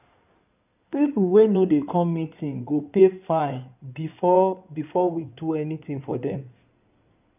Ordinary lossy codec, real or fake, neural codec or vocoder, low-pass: none; fake; codec, 16 kHz in and 24 kHz out, 2.2 kbps, FireRedTTS-2 codec; 3.6 kHz